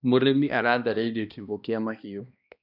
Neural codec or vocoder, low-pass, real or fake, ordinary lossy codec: codec, 16 kHz, 1 kbps, X-Codec, HuBERT features, trained on balanced general audio; 5.4 kHz; fake; none